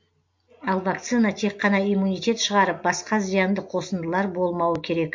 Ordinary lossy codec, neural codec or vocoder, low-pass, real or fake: MP3, 48 kbps; none; 7.2 kHz; real